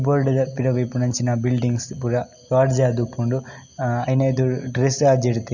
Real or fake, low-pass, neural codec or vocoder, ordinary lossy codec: real; 7.2 kHz; none; none